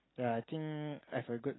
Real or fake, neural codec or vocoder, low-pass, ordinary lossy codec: real; none; 7.2 kHz; AAC, 16 kbps